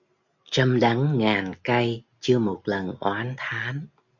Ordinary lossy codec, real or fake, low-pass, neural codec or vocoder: MP3, 64 kbps; real; 7.2 kHz; none